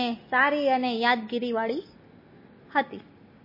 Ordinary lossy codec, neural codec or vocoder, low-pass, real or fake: MP3, 24 kbps; none; 5.4 kHz; real